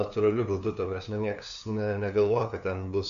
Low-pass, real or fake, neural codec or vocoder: 7.2 kHz; fake; codec, 16 kHz, 2 kbps, FunCodec, trained on LibriTTS, 25 frames a second